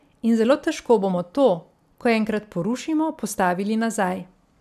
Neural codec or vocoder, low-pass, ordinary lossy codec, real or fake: none; 14.4 kHz; none; real